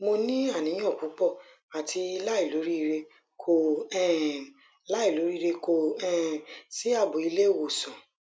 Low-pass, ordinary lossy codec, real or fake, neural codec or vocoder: none; none; real; none